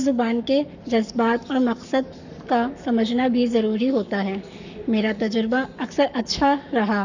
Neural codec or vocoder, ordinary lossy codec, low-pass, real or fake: codec, 44.1 kHz, 7.8 kbps, Pupu-Codec; none; 7.2 kHz; fake